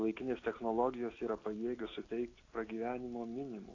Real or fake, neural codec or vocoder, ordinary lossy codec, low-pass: real; none; AAC, 32 kbps; 7.2 kHz